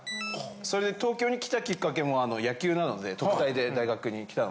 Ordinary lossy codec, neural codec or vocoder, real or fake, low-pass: none; none; real; none